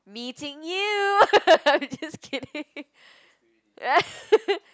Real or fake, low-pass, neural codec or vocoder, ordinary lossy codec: real; none; none; none